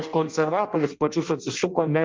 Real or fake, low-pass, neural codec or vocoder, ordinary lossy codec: fake; 7.2 kHz; codec, 16 kHz in and 24 kHz out, 0.6 kbps, FireRedTTS-2 codec; Opus, 24 kbps